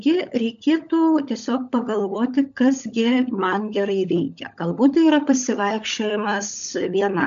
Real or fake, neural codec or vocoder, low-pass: fake; codec, 16 kHz, 16 kbps, FunCodec, trained on LibriTTS, 50 frames a second; 7.2 kHz